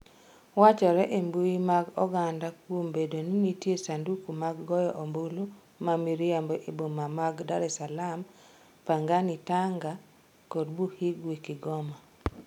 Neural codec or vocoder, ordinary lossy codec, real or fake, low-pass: none; none; real; 19.8 kHz